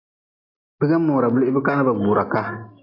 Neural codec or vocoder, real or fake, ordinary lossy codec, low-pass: none; real; AAC, 24 kbps; 5.4 kHz